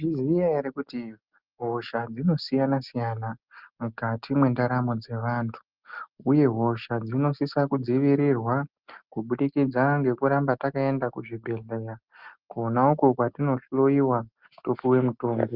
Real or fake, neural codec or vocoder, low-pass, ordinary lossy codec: real; none; 5.4 kHz; Opus, 24 kbps